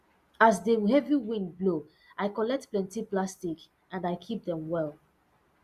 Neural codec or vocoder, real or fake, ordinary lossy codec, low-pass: none; real; Opus, 64 kbps; 14.4 kHz